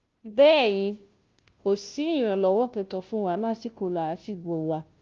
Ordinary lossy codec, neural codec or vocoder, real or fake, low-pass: Opus, 32 kbps; codec, 16 kHz, 0.5 kbps, FunCodec, trained on Chinese and English, 25 frames a second; fake; 7.2 kHz